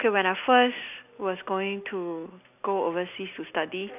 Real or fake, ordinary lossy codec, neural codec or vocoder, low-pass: real; none; none; 3.6 kHz